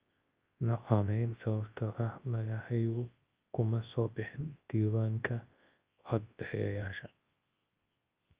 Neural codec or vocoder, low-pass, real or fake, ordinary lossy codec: codec, 24 kHz, 0.9 kbps, WavTokenizer, large speech release; 3.6 kHz; fake; Opus, 32 kbps